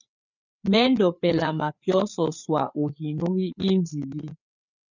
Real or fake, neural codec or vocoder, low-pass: fake; codec, 16 kHz, 4 kbps, FreqCodec, larger model; 7.2 kHz